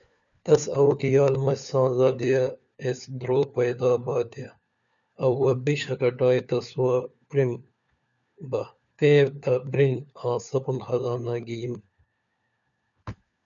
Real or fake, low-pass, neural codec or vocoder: fake; 7.2 kHz; codec, 16 kHz, 4 kbps, FunCodec, trained on LibriTTS, 50 frames a second